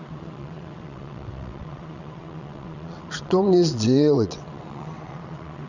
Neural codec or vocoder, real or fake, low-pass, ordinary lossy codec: vocoder, 22.05 kHz, 80 mel bands, WaveNeXt; fake; 7.2 kHz; none